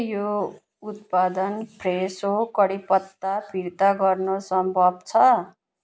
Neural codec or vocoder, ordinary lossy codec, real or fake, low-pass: none; none; real; none